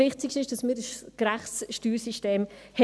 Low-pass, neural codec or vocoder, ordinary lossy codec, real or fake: none; none; none; real